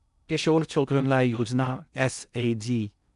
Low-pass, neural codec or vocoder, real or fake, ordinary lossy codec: 10.8 kHz; codec, 16 kHz in and 24 kHz out, 0.6 kbps, FocalCodec, streaming, 2048 codes; fake; none